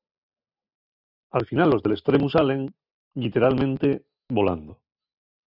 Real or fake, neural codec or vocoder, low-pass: real; none; 5.4 kHz